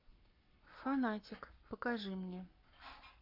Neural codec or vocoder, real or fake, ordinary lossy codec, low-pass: codec, 44.1 kHz, 7.8 kbps, Pupu-Codec; fake; MP3, 48 kbps; 5.4 kHz